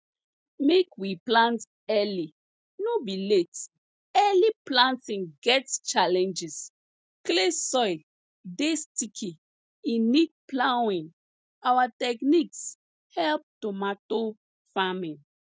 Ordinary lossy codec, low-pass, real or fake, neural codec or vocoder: none; none; real; none